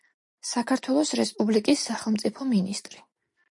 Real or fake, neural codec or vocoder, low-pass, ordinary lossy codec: real; none; 10.8 kHz; MP3, 48 kbps